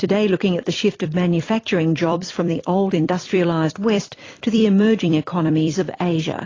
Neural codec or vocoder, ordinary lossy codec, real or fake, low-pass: vocoder, 44.1 kHz, 128 mel bands every 256 samples, BigVGAN v2; AAC, 32 kbps; fake; 7.2 kHz